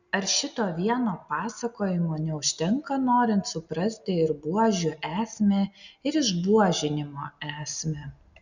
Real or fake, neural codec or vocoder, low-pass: real; none; 7.2 kHz